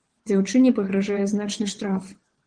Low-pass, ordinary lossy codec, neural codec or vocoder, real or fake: 9.9 kHz; Opus, 16 kbps; vocoder, 22.05 kHz, 80 mel bands, WaveNeXt; fake